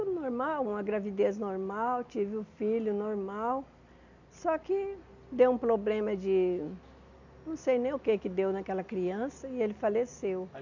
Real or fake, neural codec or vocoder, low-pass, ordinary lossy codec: real; none; 7.2 kHz; none